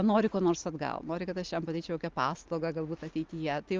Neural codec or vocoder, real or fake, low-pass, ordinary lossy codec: none; real; 7.2 kHz; Opus, 32 kbps